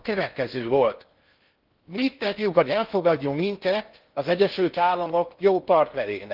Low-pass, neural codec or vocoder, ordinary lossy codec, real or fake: 5.4 kHz; codec, 16 kHz in and 24 kHz out, 0.6 kbps, FocalCodec, streaming, 4096 codes; Opus, 32 kbps; fake